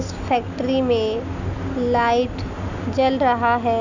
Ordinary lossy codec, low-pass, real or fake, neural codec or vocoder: none; 7.2 kHz; real; none